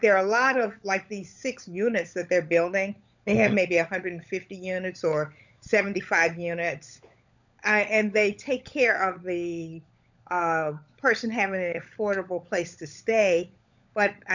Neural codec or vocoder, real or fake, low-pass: codec, 16 kHz, 16 kbps, FunCodec, trained on LibriTTS, 50 frames a second; fake; 7.2 kHz